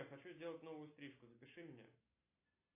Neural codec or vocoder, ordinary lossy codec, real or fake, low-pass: none; AAC, 24 kbps; real; 3.6 kHz